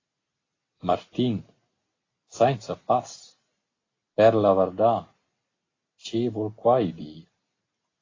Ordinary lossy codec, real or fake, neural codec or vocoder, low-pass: AAC, 32 kbps; real; none; 7.2 kHz